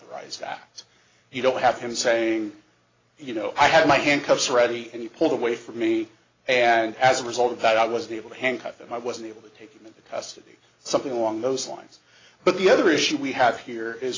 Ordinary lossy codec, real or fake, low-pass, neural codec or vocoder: AAC, 32 kbps; real; 7.2 kHz; none